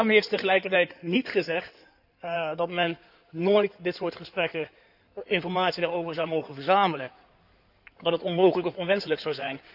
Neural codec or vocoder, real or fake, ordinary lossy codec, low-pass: codec, 16 kHz in and 24 kHz out, 2.2 kbps, FireRedTTS-2 codec; fake; none; 5.4 kHz